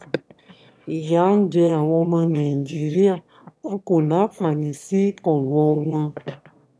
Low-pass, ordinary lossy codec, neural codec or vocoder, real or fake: none; none; autoencoder, 22.05 kHz, a latent of 192 numbers a frame, VITS, trained on one speaker; fake